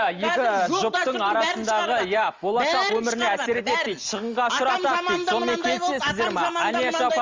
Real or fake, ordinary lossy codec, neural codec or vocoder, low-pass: real; Opus, 32 kbps; none; 7.2 kHz